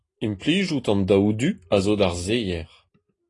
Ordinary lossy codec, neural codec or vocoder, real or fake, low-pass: AAC, 32 kbps; none; real; 10.8 kHz